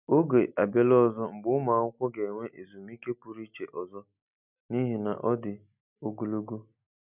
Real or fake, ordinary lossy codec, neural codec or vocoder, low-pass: real; none; none; 3.6 kHz